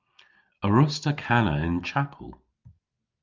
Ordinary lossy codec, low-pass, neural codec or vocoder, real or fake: Opus, 24 kbps; 7.2 kHz; none; real